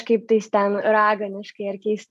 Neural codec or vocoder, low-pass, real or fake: none; 14.4 kHz; real